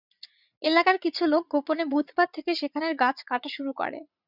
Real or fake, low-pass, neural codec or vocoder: fake; 5.4 kHz; vocoder, 44.1 kHz, 80 mel bands, Vocos